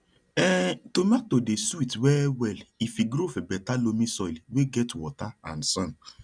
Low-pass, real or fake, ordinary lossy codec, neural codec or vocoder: 9.9 kHz; real; none; none